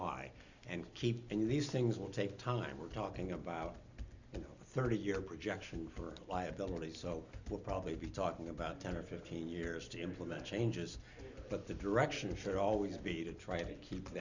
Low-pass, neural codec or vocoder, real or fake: 7.2 kHz; none; real